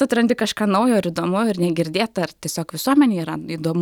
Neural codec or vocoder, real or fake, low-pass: none; real; 19.8 kHz